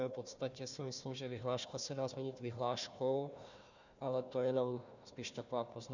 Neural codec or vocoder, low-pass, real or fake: codec, 16 kHz, 1 kbps, FunCodec, trained on Chinese and English, 50 frames a second; 7.2 kHz; fake